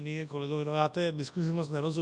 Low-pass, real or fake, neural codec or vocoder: 10.8 kHz; fake; codec, 24 kHz, 0.9 kbps, WavTokenizer, large speech release